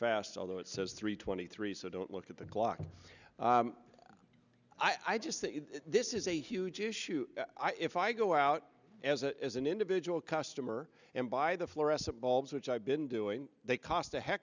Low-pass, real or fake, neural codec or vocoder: 7.2 kHz; real; none